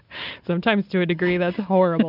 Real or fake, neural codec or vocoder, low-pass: real; none; 5.4 kHz